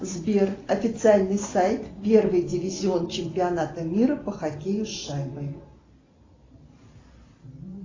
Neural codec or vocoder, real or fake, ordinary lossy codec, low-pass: none; real; AAC, 32 kbps; 7.2 kHz